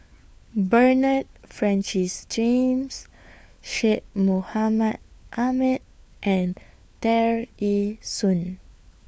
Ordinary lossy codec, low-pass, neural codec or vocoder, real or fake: none; none; codec, 16 kHz, 4 kbps, FunCodec, trained on LibriTTS, 50 frames a second; fake